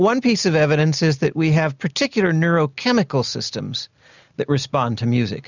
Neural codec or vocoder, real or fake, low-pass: none; real; 7.2 kHz